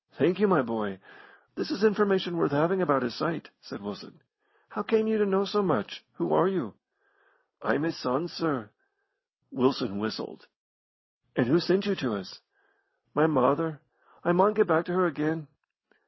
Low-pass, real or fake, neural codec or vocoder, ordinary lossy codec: 7.2 kHz; real; none; MP3, 24 kbps